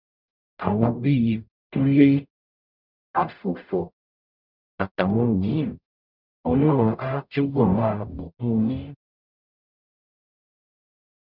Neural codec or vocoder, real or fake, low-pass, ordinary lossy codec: codec, 44.1 kHz, 0.9 kbps, DAC; fake; 5.4 kHz; none